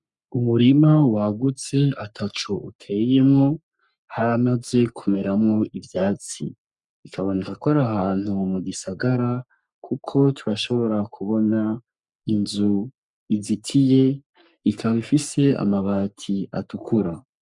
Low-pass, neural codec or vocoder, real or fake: 10.8 kHz; codec, 44.1 kHz, 3.4 kbps, Pupu-Codec; fake